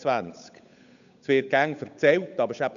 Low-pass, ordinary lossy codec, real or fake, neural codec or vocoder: 7.2 kHz; MP3, 96 kbps; fake; codec, 16 kHz, 8 kbps, FunCodec, trained on Chinese and English, 25 frames a second